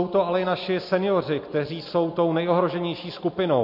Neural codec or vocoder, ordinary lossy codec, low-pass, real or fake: none; MP3, 32 kbps; 5.4 kHz; real